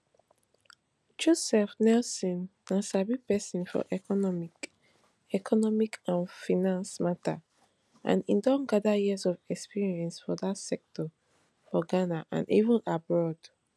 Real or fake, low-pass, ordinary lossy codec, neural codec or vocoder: real; none; none; none